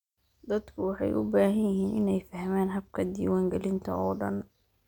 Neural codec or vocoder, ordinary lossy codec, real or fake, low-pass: none; none; real; 19.8 kHz